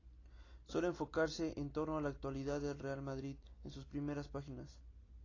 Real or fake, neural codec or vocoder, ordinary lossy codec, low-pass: real; none; AAC, 32 kbps; 7.2 kHz